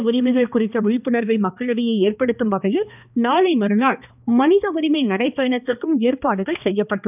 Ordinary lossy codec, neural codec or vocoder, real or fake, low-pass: none; codec, 16 kHz, 2 kbps, X-Codec, HuBERT features, trained on balanced general audio; fake; 3.6 kHz